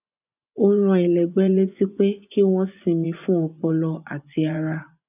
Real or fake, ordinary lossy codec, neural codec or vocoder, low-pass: fake; none; vocoder, 24 kHz, 100 mel bands, Vocos; 3.6 kHz